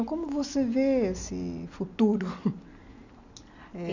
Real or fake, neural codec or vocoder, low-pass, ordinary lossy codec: real; none; 7.2 kHz; none